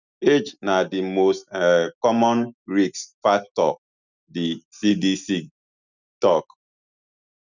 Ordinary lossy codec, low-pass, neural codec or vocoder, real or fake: none; 7.2 kHz; none; real